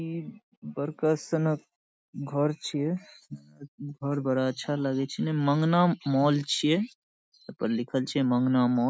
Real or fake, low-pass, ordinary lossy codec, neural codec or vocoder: real; none; none; none